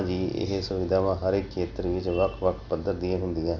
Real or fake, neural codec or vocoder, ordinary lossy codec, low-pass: real; none; none; 7.2 kHz